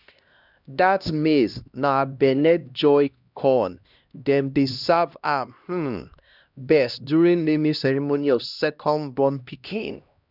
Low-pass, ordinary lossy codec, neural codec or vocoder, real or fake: 5.4 kHz; none; codec, 16 kHz, 1 kbps, X-Codec, HuBERT features, trained on LibriSpeech; fake